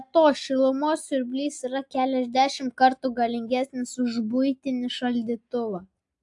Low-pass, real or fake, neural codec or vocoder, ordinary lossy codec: 10.8 kHz; real; none; AAC, 64 kbps